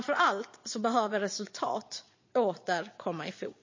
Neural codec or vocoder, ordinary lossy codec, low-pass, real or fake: vocoder, 22.05 kHz, 80 mel bands, Vocos; MP3, 32 kbps; 7.2 kHz; fake